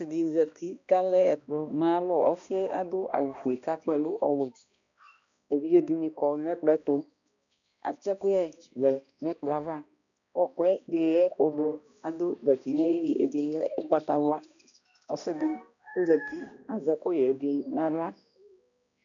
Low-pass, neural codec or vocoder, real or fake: 7.2 kHz; codec, 16 kHz, 1 kbps, X-Codec, HuBERT features, trained on balanced general audio; fake